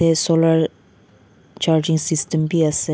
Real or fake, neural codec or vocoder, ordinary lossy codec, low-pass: real; none; none; none